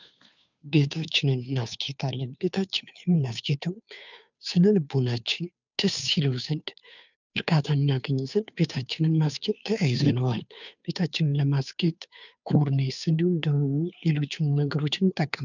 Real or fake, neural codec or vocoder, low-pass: fake; codec, 16 kHz, 2 kbps, FunCodec, trained on Chinese and English, 25 frames a second; 7.2 kHz